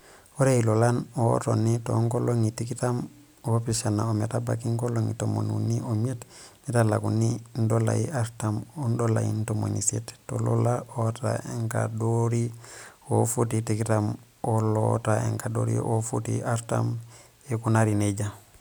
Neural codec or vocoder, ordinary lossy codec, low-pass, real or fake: none; none; none; real